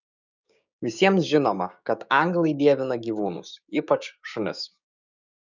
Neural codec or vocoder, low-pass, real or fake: codec, 16 kHz, 6 kbps, DAC; 7.2 kHz; fake